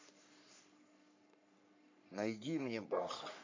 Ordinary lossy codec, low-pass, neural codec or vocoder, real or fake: MP3, 48 kbps; 7.2 kHz; codec, 44.1 kHz, 3.4 kbps, Pupu-Codec; fake